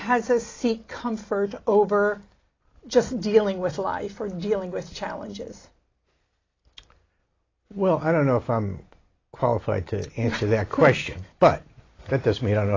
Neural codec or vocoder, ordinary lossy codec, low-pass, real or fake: none; AAC, 32 kbps; 7.2 kHz; real